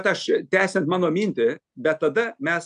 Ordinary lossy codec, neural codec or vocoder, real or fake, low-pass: AAC, 96 kbps; none; real; 10.8 kHz